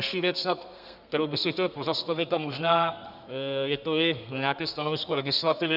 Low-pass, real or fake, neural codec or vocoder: 5.4 kHz; fake; codec, 32 kHz, 1.9 kbps, SNAC